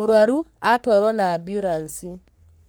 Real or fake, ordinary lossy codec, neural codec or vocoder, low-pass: fake; none; codec, 44.1 kHz, 3.4 kbps, Pupu-Codec; none